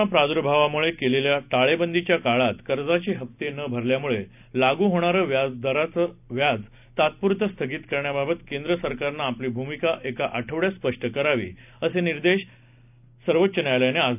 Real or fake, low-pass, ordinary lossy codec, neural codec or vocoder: real; 3.6 kHz; none; none